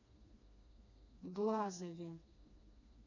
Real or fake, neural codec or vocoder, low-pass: fake; codec, 16 kHz, 2 kbps, FreqCodec, smaller model; 7.2 kHz